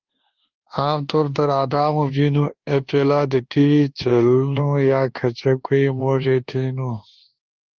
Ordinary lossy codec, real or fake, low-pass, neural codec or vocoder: Opus, 16 kbps; fake; 7.2 kHz; codec, 24 kHz, 1.2 kbps, DualCodec